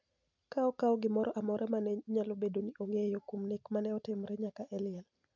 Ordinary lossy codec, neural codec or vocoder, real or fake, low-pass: none; none; real; 7.2 kHz